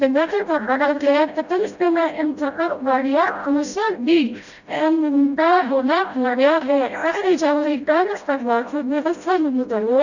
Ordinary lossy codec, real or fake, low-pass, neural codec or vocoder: none; fake; 7.2 kHz; codec, 16 kHz, 0.5 kbps, FreqCodec, smaller model